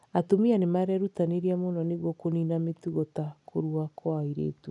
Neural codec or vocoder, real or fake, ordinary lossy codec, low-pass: none; real; none; 10.8 kHz